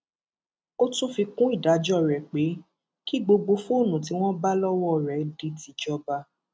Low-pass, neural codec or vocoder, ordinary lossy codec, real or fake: none; none; none; real